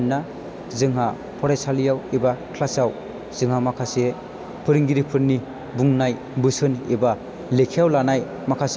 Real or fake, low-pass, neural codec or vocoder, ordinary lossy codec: real; none; none; none